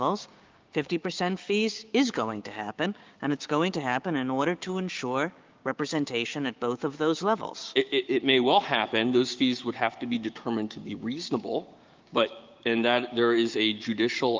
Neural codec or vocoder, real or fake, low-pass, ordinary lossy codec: codec, 16 kHz, 6 kbps, DAC; fake; 7.2 kHz; Opus, 24 kbps